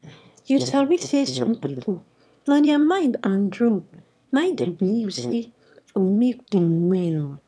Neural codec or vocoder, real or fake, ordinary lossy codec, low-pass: autoencoder, 22.05 kHz, a latent of 192 numbers a frame, VITS, trained on one speaker; fake; none; none